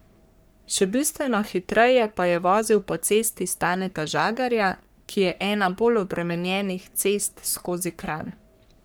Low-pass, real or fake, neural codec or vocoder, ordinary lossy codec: none; fake; codec, 44.1 kHz, 3.4 kbps, Pupu-Codec; none